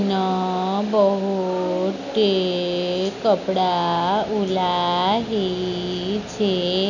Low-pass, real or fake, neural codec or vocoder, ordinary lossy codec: 7.2 kHz; real; none; none